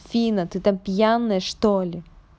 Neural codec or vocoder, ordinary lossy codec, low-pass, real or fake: none; none; none; real